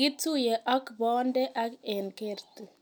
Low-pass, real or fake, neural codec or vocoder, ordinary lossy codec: none; real; none; none